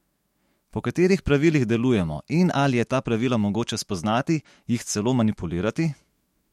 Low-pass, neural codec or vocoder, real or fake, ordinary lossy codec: 19.8 kHz; autoencoder, 48 kHz, 128 numbers a frame, DAC-VAE, trained on Japanese speech; fake; MP3, 64 kbps